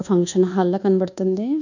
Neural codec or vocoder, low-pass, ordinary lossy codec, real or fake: codec, 24 kHz, 1.2 kbps, DualCodec; 7.2 kHz; none; fake